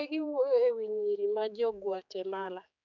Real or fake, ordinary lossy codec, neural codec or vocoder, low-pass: fake; none; codec, 16 kHz, 4 kbps, X-Codec, HuBERT features, trained on general audio; 7.2 kHz